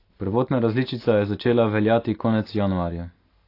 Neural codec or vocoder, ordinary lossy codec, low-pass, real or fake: none; AAC, 32 kbps; 5.4 kHz; real